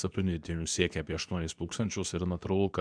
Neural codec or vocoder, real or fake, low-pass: codec, 24 kHz, 0.9 kbps, WavTokenizer, medium speech release version 2; fake; 9.9 kHz